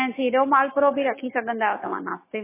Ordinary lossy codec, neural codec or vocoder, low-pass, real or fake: MP3, 16 kbps; autoencoder, 48 kHz, 128 numbers a frame, DAC-VAE, trained on Japanese speech; 3.6 kHz; fake